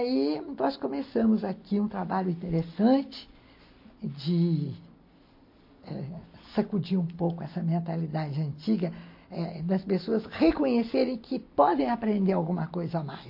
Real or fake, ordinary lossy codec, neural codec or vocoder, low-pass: real; MP3, 32 kbps; none; 5.4 kHz